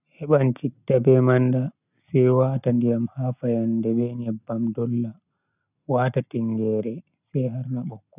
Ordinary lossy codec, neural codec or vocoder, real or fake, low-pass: none; none; real; 3.6 kHz